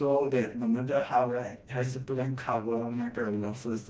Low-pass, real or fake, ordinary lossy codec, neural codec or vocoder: none; fake; none; codec, 16 kHz, 1 kbps, FreqCodec, smaller model